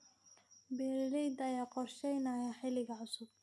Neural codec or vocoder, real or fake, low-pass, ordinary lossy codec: none; real; none; none